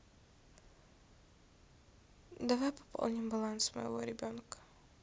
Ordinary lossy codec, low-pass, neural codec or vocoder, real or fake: none; none; none; real